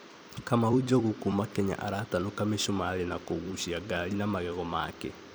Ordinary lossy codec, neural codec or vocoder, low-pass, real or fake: none; vocoder, 44.1 kHz, 128 mel bands every 256 samples, BigVGAN v2; none; fake